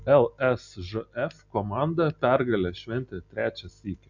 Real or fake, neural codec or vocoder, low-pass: real; none; 7.2 kHz